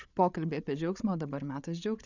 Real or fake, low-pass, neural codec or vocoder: fake; 7.2 kHz; codec, 16 kHz, 8 kbps, FreqCodec, larger model